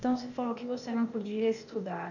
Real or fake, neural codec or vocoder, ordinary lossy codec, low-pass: fake; codec, 16 kHz, 0.8 kbps, ZipCodec; none; 7.2 kHz